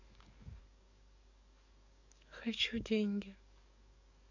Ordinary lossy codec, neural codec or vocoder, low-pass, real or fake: none; codec, 44.1 kHz, 7.8 kbps, DAC; 7.2 kHz; fake